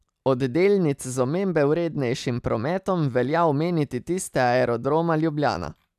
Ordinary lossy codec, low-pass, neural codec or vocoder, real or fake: none; 14.4 kHz; none; real